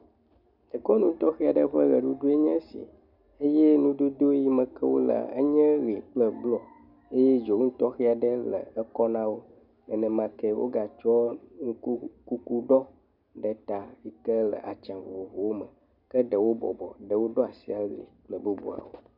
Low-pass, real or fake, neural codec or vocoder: 5.4 kHz; real; none